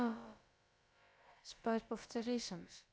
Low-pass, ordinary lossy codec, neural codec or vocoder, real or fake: none; none; codec, 16 kHz, about 1 kbps, DyCAST, with the encoder's durations; fake